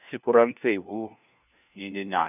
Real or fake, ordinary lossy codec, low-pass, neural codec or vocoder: fake; none; 3.6 kHz; codec, 16 kHz, 1 kbps, FunCodec, trained on LibriTTS, 50 frames a second